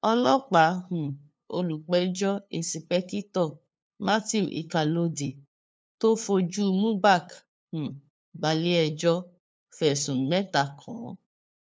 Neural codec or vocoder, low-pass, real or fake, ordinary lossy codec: codec, 16 kHz, 2 kbps, FunCodec, trained on LibriTTS, 25 frames a second; none; fake; none